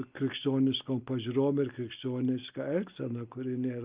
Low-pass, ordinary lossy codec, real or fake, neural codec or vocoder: 3.6 kHz; Opus, 32 kbps; real; none